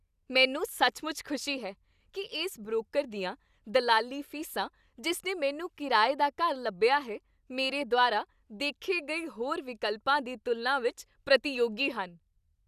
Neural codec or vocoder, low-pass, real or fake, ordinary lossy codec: none; 14.4 kHz; real; none